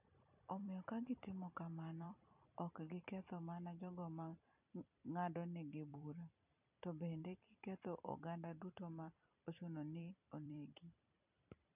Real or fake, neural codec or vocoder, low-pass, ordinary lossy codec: fake; vocoder, 44.1 kHz, 128 mel bands every 256 samples, BigVGAN v2; 3.6 kHz; none